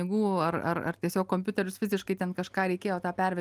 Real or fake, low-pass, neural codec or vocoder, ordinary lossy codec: real; 14.4 kHz; none; Opus, 32 kbps